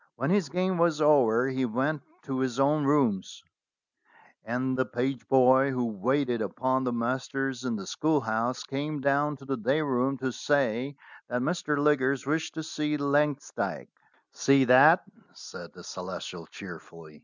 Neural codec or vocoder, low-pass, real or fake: none; 7.2 kHz; real